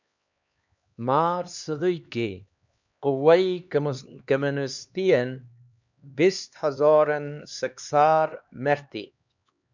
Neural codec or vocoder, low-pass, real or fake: codec, 16 kHz, 2 kbps, X-Codec, HuBERT features, trained on LibriSpeech; 7.2 kHz; fake